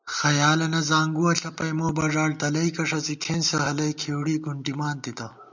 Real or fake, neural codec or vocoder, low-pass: real; none; 7.2 kHz